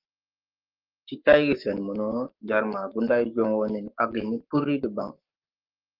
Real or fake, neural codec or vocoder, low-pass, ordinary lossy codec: fake; codec, 44.1 kHz, 7.8 kbps, Pupu-Codec; 5.4 kHz; Opus, 32 kbps